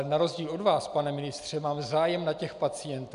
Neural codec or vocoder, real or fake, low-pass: none; real; 10.8 kHz